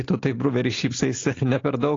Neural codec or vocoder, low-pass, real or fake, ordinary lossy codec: none; 7.2 kHz; real; AAC, 32 kbps